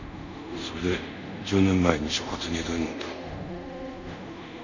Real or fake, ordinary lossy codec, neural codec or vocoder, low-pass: fake; none; codec, 24 kHz, 0.5 kbps, DualCodec; 7.2 kHz